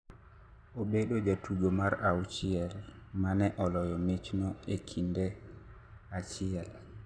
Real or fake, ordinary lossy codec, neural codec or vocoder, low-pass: real; none; none; none